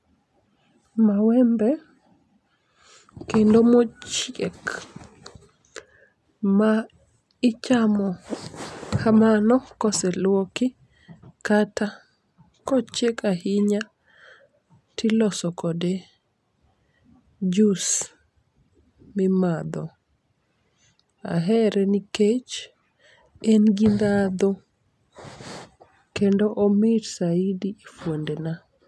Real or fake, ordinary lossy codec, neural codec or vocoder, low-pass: real; none; none; none